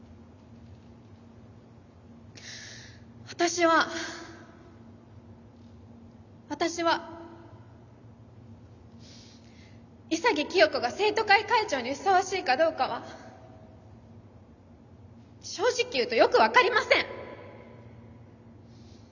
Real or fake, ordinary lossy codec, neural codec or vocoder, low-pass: real; none; none; 7.2 kHz